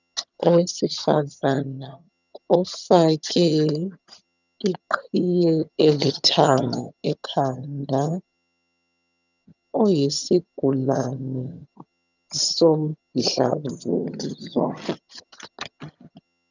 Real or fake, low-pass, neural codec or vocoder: fake; 7.2 kHz; vocoder, 22.05 kHz, 80 mel bands, HiFi-GAN